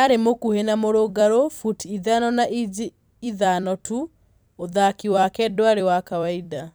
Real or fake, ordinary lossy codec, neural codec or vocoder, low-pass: fake; none; vocoder, 44.1 kHz, 128 mel bands every 256 samples, BigVGAN v2; none